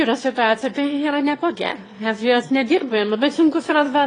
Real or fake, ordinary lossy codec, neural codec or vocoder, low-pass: fake; AAC, 32 kbps; autoencoder, 22.05 kHz, a latent of 192 numbers a frame, VITS, trained on one speaker; 9.9 kHz